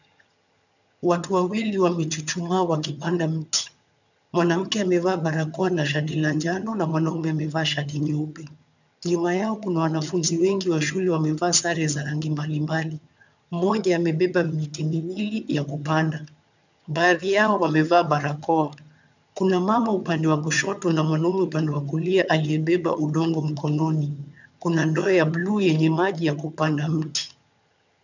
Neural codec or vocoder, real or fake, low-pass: vocoder, 22.05 kHz, 80 mel bands, HiFi-GAN; fake; 7.2 kHz